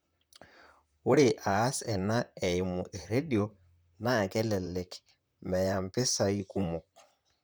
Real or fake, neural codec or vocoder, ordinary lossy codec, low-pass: fake; vocoder, 44.1 kHz, 128 mel bands, Pupu-Vocoder; none; none